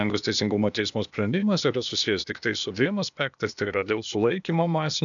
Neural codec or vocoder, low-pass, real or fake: codec, 16 kHz, 0.8 kbps, ZipCodec; 7.2 kHz; fake